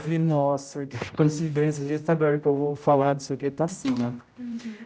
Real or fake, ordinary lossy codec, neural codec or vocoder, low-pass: fake; none; codec, 16 kHz, 0.5 kbps, X-Codec, HuBERT features, trained on general audio; none